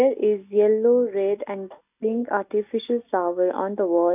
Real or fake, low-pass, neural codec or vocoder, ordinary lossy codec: real; 3.6 kHz; none; none